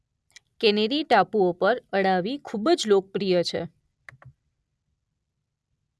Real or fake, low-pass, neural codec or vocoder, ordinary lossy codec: real; none; none; none